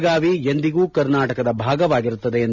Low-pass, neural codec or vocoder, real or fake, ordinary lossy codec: 7.2 kHz; none; real; none